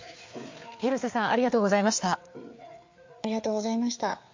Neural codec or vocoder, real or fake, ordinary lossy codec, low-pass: codec, 16 kHz, 4 kbps, FreqCodec, larger model; fake; MP3, 48 kbps; 7.2 kHz